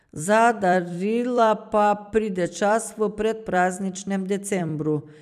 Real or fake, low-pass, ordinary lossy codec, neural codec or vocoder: fake; 14.4 kHz; none; vocoder, 44.1 kHz, 128 mel bands every 256 samples, BigVGAN v2